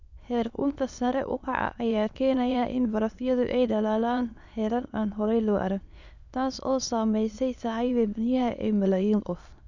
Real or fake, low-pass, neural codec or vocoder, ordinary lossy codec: fake; 7.2 kHz; autoencoder, 22.05 kHz, a latent of 192 numbers a frame, VITS, trained on many speakers; none